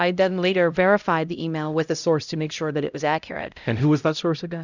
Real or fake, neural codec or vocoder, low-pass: fake; codec, 16 kHz, 0.5 kbps, X-Codec, HuBERT features, trained on LibriSpeech; 7.2 kHz